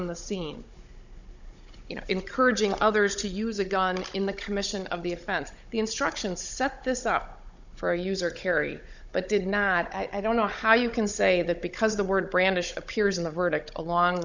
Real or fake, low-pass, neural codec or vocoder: fake; 7.2 kHz; codec, 16 kHz, 16 kbps, FunCodec, trained on Chinese and English, 50 frames a second